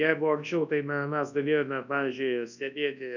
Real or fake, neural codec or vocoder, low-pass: fake; codec, 24 kHz, 0.9 kbps, WavTokenizer, large speech release; 7.2 kHz